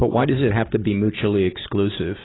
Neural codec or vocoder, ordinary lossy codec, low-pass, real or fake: none; AAC, 16 kbps; 7.2 kHz; real